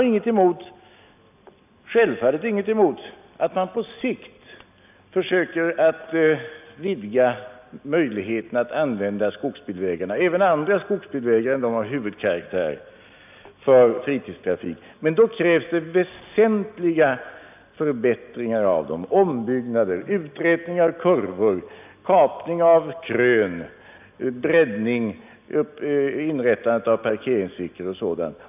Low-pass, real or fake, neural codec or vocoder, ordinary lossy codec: 3.6 kHz; real; none; none